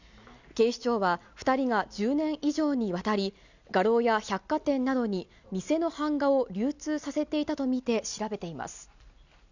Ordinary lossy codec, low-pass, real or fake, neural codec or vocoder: none; 7.2 kHz; real; none